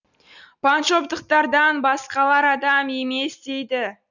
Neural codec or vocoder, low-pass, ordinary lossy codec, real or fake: none; 7.2 kHz; none; real